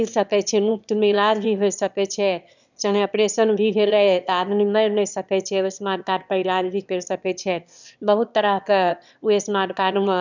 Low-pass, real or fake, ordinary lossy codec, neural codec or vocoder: 7.2 kHz; fake; none; autoencoder, 22.05 kHz, a latent of 192 numbers a frame, VITS, trained on one speaker